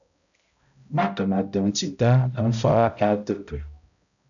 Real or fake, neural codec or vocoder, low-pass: fake; codec, 16 kHz, 0.5 kbps, X-Codec, HuBERT features, trained on balanced general audio; 7.2 kHz